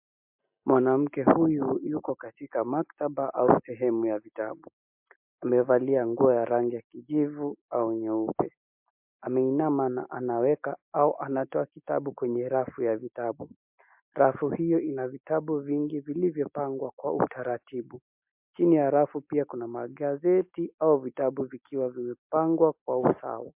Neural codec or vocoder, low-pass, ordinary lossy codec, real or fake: none; 3.6 kHz; MP3, 32 kbps; real